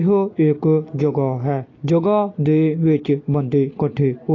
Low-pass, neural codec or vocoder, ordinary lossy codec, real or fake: 7.2 kHz; none; AAC, 32 kbps; real